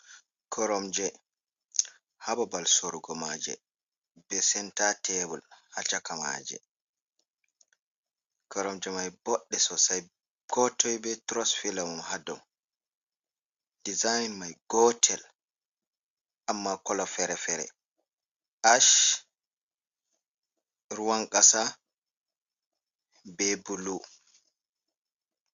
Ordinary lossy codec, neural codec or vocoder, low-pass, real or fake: Opus, 64 kbps; none; 7.2 kHz; real